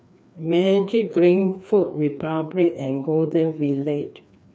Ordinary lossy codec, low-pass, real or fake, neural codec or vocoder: none; none; fake; codec, 16 kHz, 2 kbps, FreqCodec, larger model